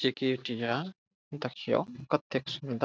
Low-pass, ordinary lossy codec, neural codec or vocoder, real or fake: none; none; none; real